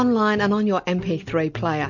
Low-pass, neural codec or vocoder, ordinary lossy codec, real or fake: 7.2 kHz; none; MP3, 64 kbps; real